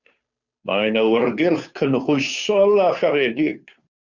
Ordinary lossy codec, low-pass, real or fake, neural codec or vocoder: Opus, 64 kbps; 7.2 kHz; fake; codec, 16 kHz, 2 kbps, FunCodec, trained on Chinese and English, 25 frames a second